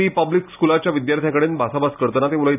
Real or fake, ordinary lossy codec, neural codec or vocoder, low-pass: real; none; none; 3.6 kHz